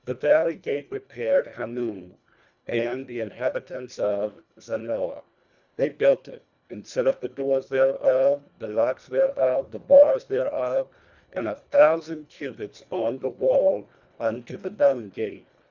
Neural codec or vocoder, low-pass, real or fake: codec, 24 kHz, 1.5 kbps, HILCodec; 7.2 kHz; fake